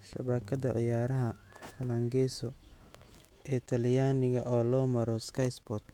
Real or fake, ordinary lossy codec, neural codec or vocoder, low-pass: fake; MP3, 96 kbps; autoencoder, 48 kHz, 128 numbers a frame, DAC-VAE, trained on Japanese speech; 19.8 kHz